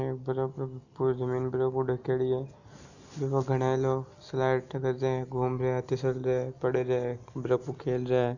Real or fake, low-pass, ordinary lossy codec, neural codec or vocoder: real; 7.2 kHz; none; none